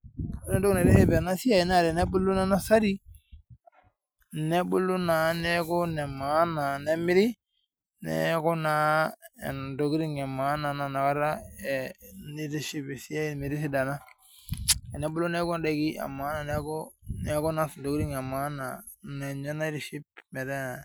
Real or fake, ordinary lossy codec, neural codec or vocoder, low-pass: real; none; none; none